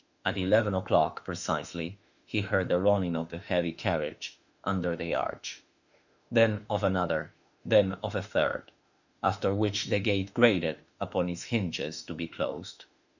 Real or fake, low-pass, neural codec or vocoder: fake; 7.2 kHz; autoencoder, 48 kHz, 32 numbers a frame, DAC-VAE, trained on Japanese speech